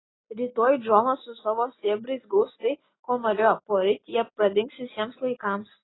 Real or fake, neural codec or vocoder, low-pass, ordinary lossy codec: real; none; 7.2 kHz; AAC, 16 kbps